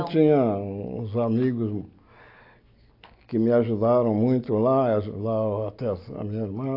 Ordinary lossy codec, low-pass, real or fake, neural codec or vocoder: AAC, 32 kbps; 5.4 kHz; real; none